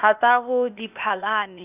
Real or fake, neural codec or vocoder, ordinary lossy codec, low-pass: fake; codec, 16 kHz, 0.8 kbps, ZipCodec; none; 3.6 kHz